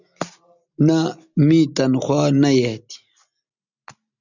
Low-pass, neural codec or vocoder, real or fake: 7.2 kHz; none; real